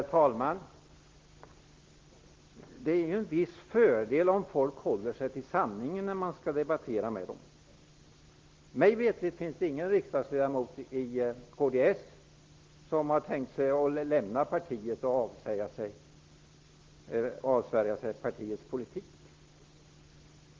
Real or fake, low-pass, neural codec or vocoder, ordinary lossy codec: real; 7.2 kHz; none; Opus, 16 kbps